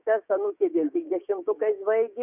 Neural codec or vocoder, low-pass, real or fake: codec, 16 kHz, 6 kbps, DAC; 3.6 kHz; fake